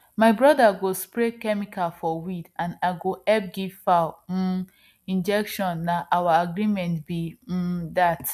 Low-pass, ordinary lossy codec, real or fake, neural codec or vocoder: 14.4 kHz; none; real; none